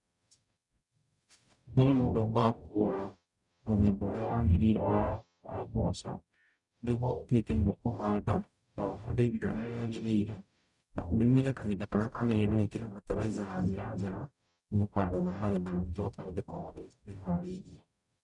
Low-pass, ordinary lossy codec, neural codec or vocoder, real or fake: 10.8 kHz; none; codec, 44.1 kHz, 0.9 kbps, DAC; fake